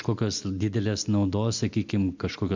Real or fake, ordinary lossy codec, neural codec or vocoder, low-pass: real; MP3, 64 kbps; none; 7.2 kHz